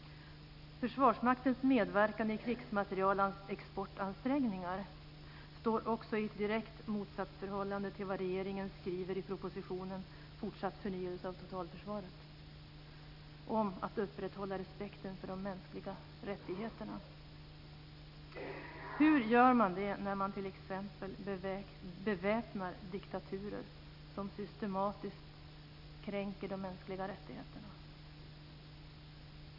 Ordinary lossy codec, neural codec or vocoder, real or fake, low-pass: none; none; real; 5.4 kHz